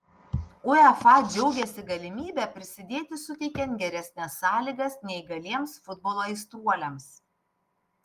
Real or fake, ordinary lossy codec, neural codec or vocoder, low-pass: real; Opus, 24 kbps; none; 14.4 kHz